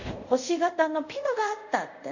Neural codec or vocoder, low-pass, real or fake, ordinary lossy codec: codec, 24 kHz, 0.5 kbps, DualCodec; 7.2 kHz; fake; none